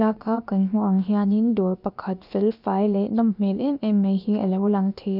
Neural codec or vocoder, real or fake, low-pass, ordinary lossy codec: codec, 16 kHz, about 1 kbps, DyCAST, with the encoder's durations; fake; 5.4 kHz; none